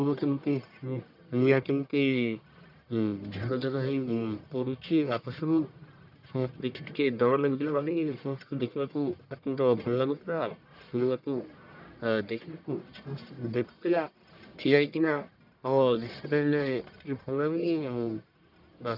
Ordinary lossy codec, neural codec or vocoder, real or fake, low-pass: none; codec, 44.1 kHz, 1.7 kbps, Pupu-Codec; fake; 5.4 kHz